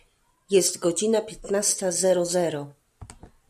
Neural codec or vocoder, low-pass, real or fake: none; 14.4 kHz; real